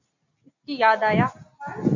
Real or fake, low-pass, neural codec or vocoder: real; 7.2 kHz; none